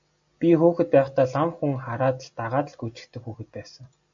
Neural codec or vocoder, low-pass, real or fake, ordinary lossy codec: none; 7.2 kHz; real; AAC, 64 kbps